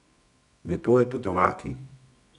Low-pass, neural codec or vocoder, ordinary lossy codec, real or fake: 10.8 kHz; codec, 24 kHz, 0.9 kbps, WavTokenizer, medium music audio release; MP3, 96 kbps; fake